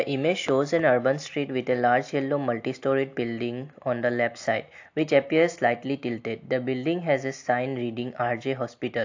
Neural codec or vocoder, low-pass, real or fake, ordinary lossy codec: none; 7.2 kHz; real; AAC, 48 kbps